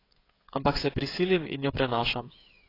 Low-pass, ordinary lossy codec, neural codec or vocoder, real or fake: 5.4 kHz; AAC, 24 kbps; codec, 16 kHz, 8 kbps, FreqCodec, smaller model; fake